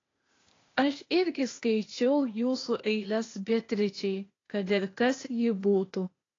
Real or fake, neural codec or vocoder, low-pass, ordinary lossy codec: fake; codec, 16 kHz, 0.8 kbps, ZipCodec; 7.2 kHz; AAC, 32 kbps